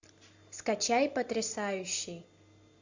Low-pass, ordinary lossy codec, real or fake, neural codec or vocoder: 7.2 kHz; AAC, 48 kbps; real; none